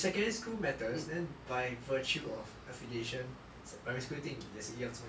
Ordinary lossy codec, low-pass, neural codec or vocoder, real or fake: none; none; none; real